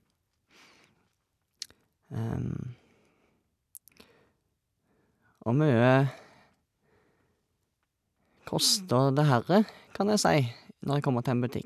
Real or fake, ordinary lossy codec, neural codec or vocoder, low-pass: real; none; none; 14.4 kHz